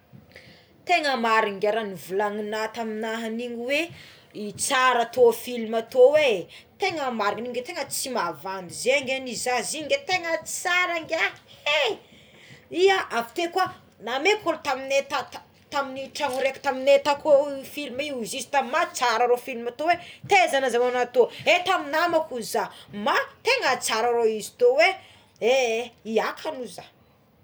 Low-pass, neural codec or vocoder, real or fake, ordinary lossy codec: none; none; real; none